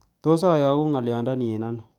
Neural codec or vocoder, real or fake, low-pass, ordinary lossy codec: codec, 44.1 kHz, 7.8 kbps, DAC; fake; 19.8 kHz; none